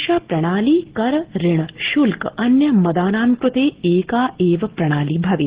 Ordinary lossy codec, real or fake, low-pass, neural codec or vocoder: Opus, 16 kbps; real; 3.6 kHz; none